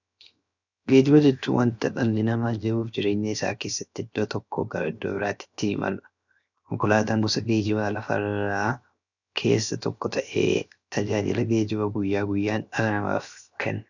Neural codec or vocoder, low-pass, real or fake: codec, 16 kHz, 0.7 kbps, FocalCodec; 7.2 kHz; fake